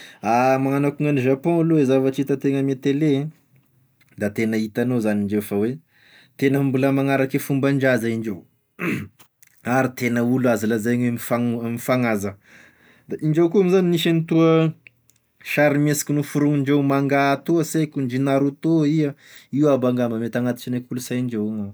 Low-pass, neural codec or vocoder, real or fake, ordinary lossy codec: none; none; real; none